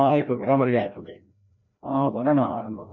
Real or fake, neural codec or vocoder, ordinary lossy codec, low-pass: fake; codec, 16 kHz, 1 kbps, FreqCodec, larger model; MP3, 48 kbps; 7.2 kHz